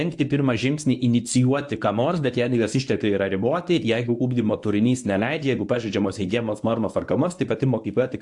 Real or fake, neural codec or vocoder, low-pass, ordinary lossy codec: fake; codec, 24 kHz, 0.9 kbps, WavTokenizer, medium speech release version 1; 10.8 kHz; AAC, 64 kbps